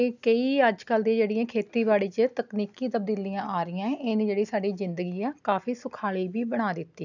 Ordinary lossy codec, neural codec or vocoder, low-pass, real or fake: none; none; 7.2 kHz; real